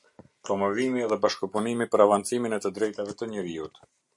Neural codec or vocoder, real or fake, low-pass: none; real; 10.8 kHz